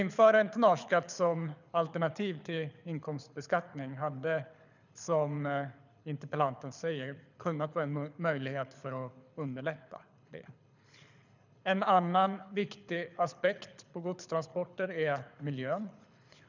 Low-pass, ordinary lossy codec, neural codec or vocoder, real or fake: 7.2 kHz; none; codec, 24 kHz, 6 kbps, HILCodec; fake